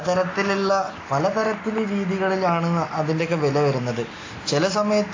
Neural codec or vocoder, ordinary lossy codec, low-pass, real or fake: none; AAC, 32 kbps; 7.2 kHz; real